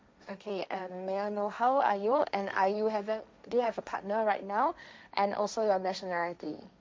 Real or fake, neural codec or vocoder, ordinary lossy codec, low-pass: fake; codec, 16 kHz, 1.1 kbps, Voila-Tokenizer; none; none